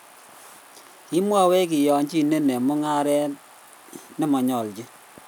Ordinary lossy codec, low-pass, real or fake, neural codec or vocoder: none; none; real; none